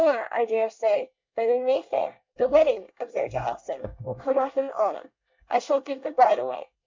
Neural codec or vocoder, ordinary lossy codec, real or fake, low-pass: codec, 24 kHz, 1 kbps, SNAC; MP3, 64 kbps; fake; 7.2 kHz